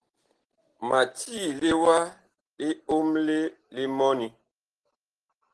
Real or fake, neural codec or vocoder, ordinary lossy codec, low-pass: real; none; Opus, 16 kbps; 10.8 kHz